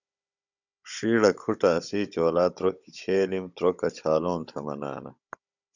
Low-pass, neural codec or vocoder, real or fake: 7.2 kHz; codec, 16 kHz, 16 kbps, FunCodec, trained on Chinese and English, 50 frames a second; fake